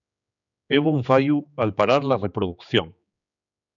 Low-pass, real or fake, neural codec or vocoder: 7.2 kHz; fake; codec, 16 kHz, 4 kbps, X-Codec, HuBERT features, trained on general audio